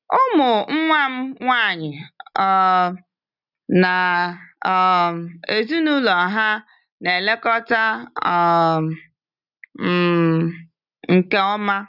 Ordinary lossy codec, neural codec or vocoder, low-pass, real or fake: none; none; 5.4 kHz; real